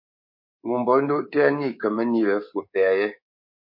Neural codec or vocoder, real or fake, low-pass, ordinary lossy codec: codec, 24 kHz, 3.1 kbps, DualCodec; fake; 5.4 kHz; MP3, 32 kbps